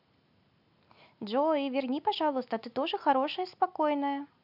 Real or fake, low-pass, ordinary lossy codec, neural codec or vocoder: real; 5.4 kHz; none; none